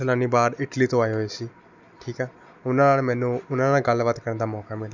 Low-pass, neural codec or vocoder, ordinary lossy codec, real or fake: 7.2 kHz; none; none; real